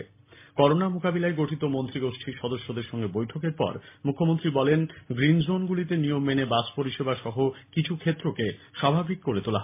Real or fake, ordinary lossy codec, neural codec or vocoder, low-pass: real; MP3, 24 kbps; none; 3.6 kHz